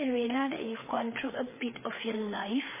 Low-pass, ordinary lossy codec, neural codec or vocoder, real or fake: 3.6 kHz; MP3, 16 kbps; codec, 16 kHz, 4 kbps, FreqCodec, larger model; fake